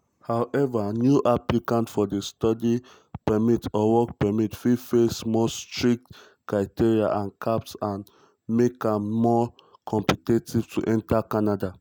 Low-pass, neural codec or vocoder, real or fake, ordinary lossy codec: none; none; real; none